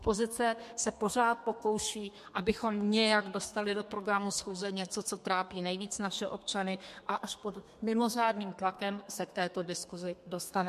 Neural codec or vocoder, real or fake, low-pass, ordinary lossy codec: codec, 32 kHz, 1.9 kbps, SNAC; fake; 14.4 kHz; MP3, 64 kbps